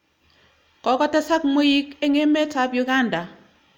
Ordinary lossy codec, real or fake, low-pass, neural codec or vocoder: none; real; 19.8 kHz; none